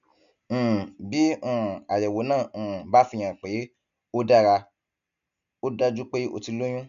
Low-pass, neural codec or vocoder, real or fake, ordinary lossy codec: 7.2 kHz; none; real; none